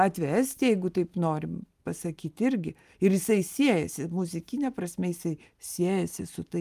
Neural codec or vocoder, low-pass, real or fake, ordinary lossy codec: none; 14.4 kHz; real; Opus, 32 kbps